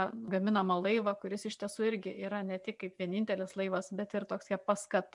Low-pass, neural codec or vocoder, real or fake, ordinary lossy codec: 10.8 kHz; vocoder, 24 kHz, 100 mel bands, Vocos; fake; MP3, 96 kbps